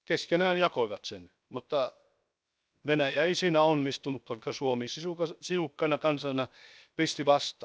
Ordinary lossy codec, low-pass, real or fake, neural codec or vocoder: none; none; fake; codec, 16 kHz, 0.7 kbps, FocalCodec